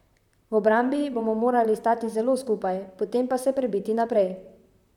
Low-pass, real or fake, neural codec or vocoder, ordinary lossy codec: 19.8 kHz; fake; vocoder, 44.1 kHz, 128 mel bands, Pupu-Vocoder; none